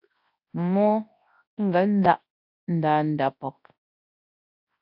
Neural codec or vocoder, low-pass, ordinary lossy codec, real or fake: codec, 24 kHz, 0.9 kbps, WavTokenizer, large speech release; 5.4 kHz; AAC, 48 kbps; fake